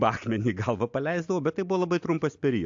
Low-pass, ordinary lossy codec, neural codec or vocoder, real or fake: 7.2 kHz; AAC, 64 kbps; none; real